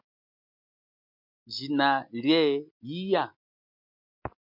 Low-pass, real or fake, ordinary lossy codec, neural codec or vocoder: 5.4 kHz; real; MP3, 48 kbps; none